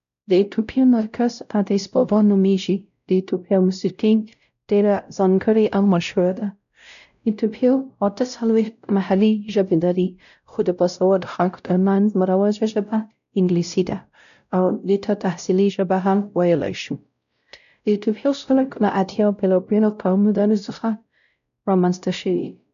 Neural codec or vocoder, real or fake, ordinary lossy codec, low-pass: codec, 16 kHz, 0.5 kbps, X-Codec, WavLM features, trained on Multilingual LibriSpeech; fake; none; 7.2 kHz